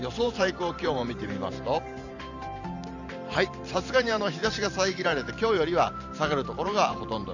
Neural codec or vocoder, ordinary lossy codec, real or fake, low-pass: vocoder, 44.1 kHz, 128 mel bands every 512 samples, BigVGAN v2; none; fake; 7.2 kHz